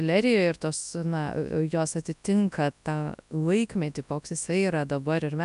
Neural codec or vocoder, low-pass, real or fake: codec, 24 kHz, 0.9 kbps, WavTokenizer, large speech release; 10.8 kHz; fake